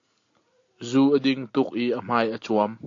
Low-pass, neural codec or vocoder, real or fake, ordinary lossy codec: 7.2 kHz; none; real; AAC, 48 kbps